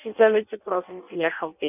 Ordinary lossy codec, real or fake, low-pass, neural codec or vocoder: none; fake; 3.6 kHz; codec, 16 kHz in and 24 kHz out, 0.6 kbps, FireRedTTS-2 codec